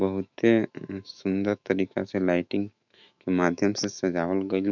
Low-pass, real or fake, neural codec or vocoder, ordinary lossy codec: 7.2 kHz; fake; autoencoder, 48 kHz, 128 numbers a frame, DAC-VAE, trained on Japanese speech; none